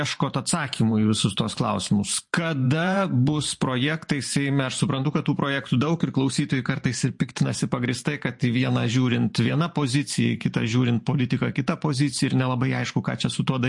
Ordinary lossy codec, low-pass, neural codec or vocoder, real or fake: MP3, 48 kbps; 10.8 kHz; vocoder, 44.1 kHz, 128 mel bands every 512 samples, BigVGAN v2; fake